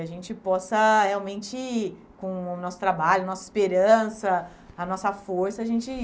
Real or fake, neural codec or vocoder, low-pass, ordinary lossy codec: real; none; none; none